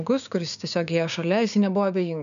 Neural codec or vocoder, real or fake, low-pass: codec, 16 kHz, 6 kbps, DAC; fake; 7.2 kHz